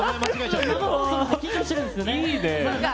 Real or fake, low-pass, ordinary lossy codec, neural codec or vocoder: real; none; none; none